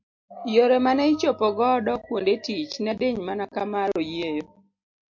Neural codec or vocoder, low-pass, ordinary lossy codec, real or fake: none; 7.2 kHz; MP3, 48 kbps; real